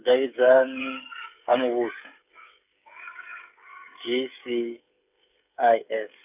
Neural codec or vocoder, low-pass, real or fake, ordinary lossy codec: codec, 16 kHz, 8 kbps, FreqCodec, smaller model; 3.6 kHz; fake; none